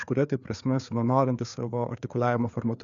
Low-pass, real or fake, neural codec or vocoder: 7.2 kHz; fake; codec, 16 kHz, 4 kbps, FreqCodec, larger model